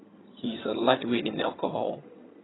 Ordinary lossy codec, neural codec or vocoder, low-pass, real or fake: AAC, 16 kbps; vocoder, 22.05 kHz, 80 mel bands, HiFi-GAN; 7.2 kHz; fake